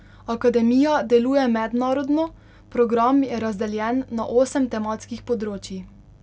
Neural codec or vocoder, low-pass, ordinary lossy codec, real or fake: none; none; none; real